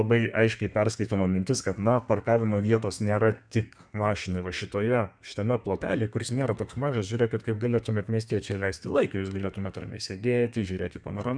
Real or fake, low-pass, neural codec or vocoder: fake; 9.9 kHz; codec, 32 kHz, 1.9 kbps, SNAC